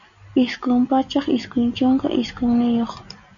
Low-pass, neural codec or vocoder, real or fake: 7.2 kHz; none; real